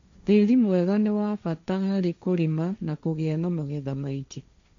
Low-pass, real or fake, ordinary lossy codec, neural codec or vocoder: 7.2 kHz; fake; MP3, 64 kbps; codec, 16 kHz, 1.1 kbps, Voila-Tokenizer